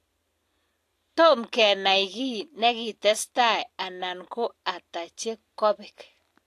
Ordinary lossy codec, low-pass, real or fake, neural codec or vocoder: AAC, 64 kbps; 14.4 kHz; real; none